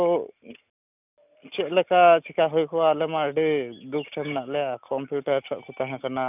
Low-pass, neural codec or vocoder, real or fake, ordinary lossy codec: 3.6 kHz; none; real; none